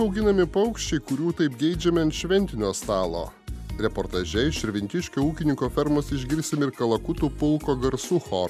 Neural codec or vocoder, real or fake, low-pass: none; real; 14.4 kHz